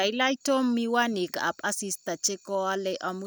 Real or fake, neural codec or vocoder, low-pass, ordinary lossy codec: real; none; none; none